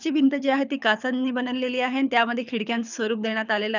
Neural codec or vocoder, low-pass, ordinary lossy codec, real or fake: codec, 24 kHz, 6 kbps, HILCodec; 7.2 kHz; none; fake